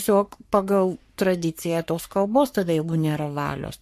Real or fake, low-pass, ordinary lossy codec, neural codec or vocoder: fake; 14.4 kHz; MP3, 64 kbps; codec, 44.1 kHz, 3.4 kbps, Pupu-Codec